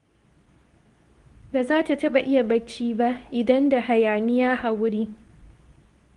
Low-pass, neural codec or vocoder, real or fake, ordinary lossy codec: 10.8 kHz; codec, 24 kHz, 0.9 kbps, WavTokenizer, medium speech release version 2; fake; Opus, 24 kbps